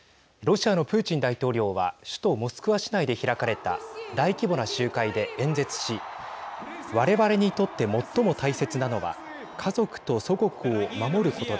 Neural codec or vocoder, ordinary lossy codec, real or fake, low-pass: none; none; real; none